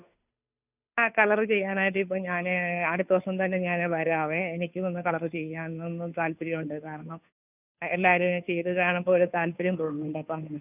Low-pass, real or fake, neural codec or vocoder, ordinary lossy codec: 3.6 kHz; fake; codec, 16 kHz, 2 kbps, FunCodec, trained on Chinese and English, 25 frames a second; none